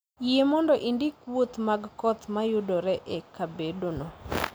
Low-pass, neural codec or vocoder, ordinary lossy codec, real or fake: none; none; none; real